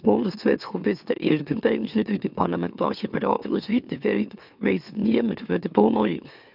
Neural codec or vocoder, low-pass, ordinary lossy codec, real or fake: autoencoder, 44.1 kHz, a latent of 192 numbers a frame, MeloTTS; 5.4 kHz; none; fake